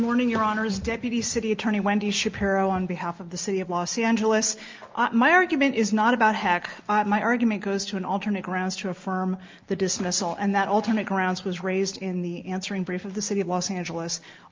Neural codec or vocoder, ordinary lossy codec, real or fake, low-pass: none; Opus, 24 kbps; real; 7.2 kHz